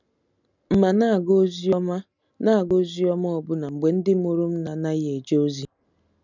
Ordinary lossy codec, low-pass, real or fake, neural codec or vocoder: none; 7.2 kHz; real; none